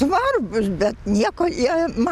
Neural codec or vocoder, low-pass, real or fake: none; 14.4 kHz; real